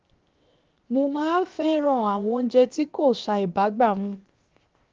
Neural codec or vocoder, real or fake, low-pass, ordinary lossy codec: codec, 16 kHz, 0.7 kbps, FocalCodec; fake; 7.2 kHz; Opus, 24 kbps